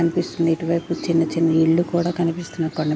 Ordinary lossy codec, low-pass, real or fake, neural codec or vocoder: none; none; real; none